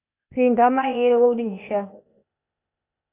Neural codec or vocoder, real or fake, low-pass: codec, 16 kHz, 0.8 kbps, ZipCodec; fake; 3.6 kHz